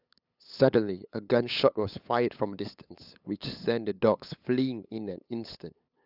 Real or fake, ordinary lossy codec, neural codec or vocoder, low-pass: fake; none; codec, 16 kHz, 8 kbps, FunCodec, trained on LibriTTS, 25 frames a second; 5.4 kHz